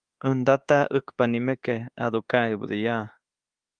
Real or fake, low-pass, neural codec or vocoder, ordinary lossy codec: fake; 9.9 kHz; codec, 24 kHz, 1.2 kbps, DualCodec; Opus, 24 kbps